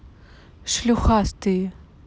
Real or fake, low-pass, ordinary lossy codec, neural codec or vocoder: real; none; none; none